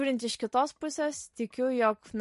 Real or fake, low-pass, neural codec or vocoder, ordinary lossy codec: real; 14.4 kHz; none; MP3, 48 kbps